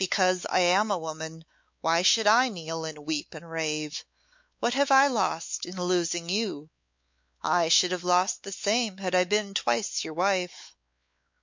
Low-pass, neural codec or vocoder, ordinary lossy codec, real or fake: 7.2 kHz; codec, 16 kHz, 4 kbps, X-Codec, WavLM features, trained on Multilingual LibriSpeech; MP3, 48 kbps; fake